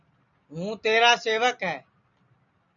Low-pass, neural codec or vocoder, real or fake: 7.2 kHz; none; real